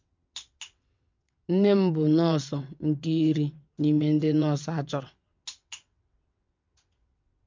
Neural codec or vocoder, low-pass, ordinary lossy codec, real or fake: vocoder, 22.05 kHz, 80 mel bands, WaveNeXt; 7.2 kHz; MP3, 64 kbps; fake